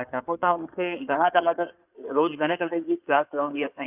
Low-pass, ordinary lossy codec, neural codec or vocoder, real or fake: 3.6 kHz; none; codec, 16 kHz in and 24 kHz out, 2.2 kbps, FireRedTTS-2 codec; fake